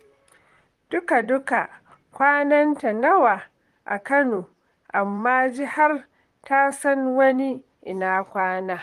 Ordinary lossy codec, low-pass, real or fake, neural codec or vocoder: Opus, 24 kbps; 14.4 kHz; fake; vocoder, 44.1 kHz, 128 mel bands, Pupu-Vocoder